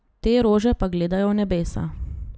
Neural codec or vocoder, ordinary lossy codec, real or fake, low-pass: none; none; real; none